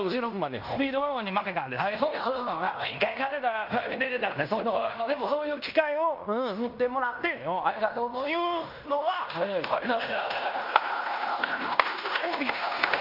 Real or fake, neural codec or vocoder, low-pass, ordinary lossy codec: fake; codec, 16 kHz in and 24 kHz out, 0.9 kbps, LongCat-Audio-Codec, fine tuned four codebook decoder; 5.4 kHz; none